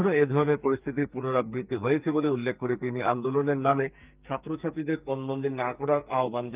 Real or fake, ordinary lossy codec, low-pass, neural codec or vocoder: fake; Opus, 24 kbps; 3.6 kHz; codec, 44.1 kHz, 2.6 kbps, SNAC